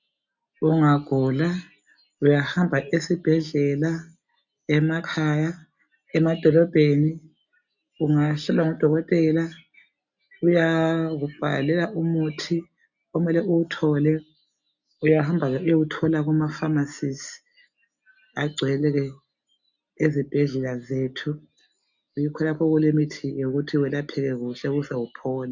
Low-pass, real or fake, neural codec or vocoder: 7.2 kHz; real; none